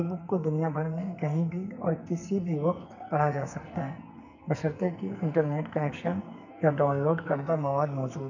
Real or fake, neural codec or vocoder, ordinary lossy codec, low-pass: fake; codec, 44.1 kHz, 2.6 kbps, SNAC; none; 7.2 kHz